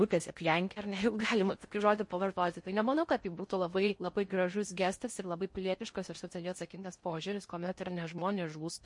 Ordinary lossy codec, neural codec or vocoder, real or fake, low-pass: MP3, 48 kbps; codec, 16 kHz in and 24 kHz out, 0.6 kbps, FocalCodec, streaming, 4096 codes; fake; 10.8 kHz